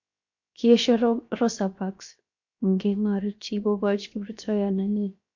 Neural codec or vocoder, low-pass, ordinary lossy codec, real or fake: codec, 16 kHz, 0.7 kbps, FocalCodec; 7.2 kHz; MP3, 48 kbps; fake